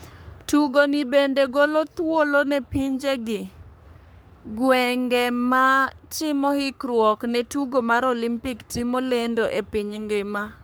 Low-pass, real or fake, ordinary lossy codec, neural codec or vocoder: none; fake; none; codec, 44.1 kHz, 3.4 kbps, Pupu-Codec